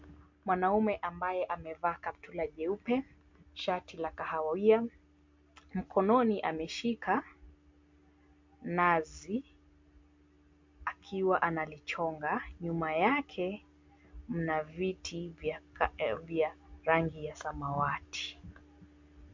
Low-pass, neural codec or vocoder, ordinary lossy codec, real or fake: 7.2 kHz; none; MP3, 48 kbps; real